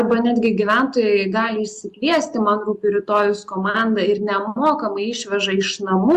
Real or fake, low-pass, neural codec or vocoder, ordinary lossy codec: real; 14.4 kHz; none; Opus, 64 kbps